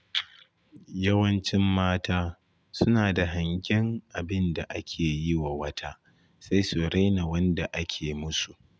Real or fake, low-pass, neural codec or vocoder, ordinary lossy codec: real; none; none; none